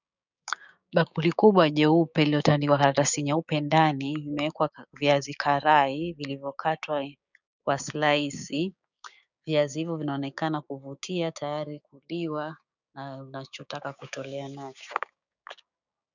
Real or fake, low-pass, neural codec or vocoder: fake; 7.2 kHz; codec, 16 kHz, 6 kbps, DAC